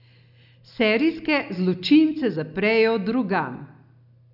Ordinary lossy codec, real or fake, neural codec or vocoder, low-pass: none; real; none; 5.4 kHz